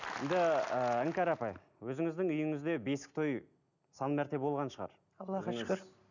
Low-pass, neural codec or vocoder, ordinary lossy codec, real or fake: 7.2 kHz; none; none; real